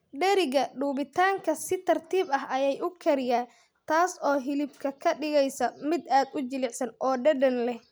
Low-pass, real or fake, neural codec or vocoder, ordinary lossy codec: none; real; none; none